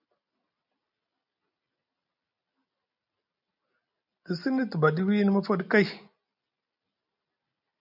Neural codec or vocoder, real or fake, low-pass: none; real; 5.4 kHz